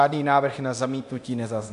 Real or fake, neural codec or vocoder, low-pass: fake; codec, 24 kHz, 0.9 kbps, DualCodec; 10.8 kHz